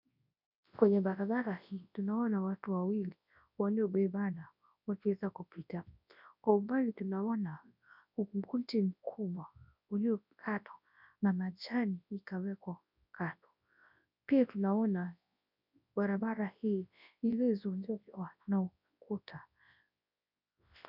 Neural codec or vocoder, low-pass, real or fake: codec, 24 kHz, 0.9 kbps, WavTokenizer, large speech release; 5.4 kHz; fake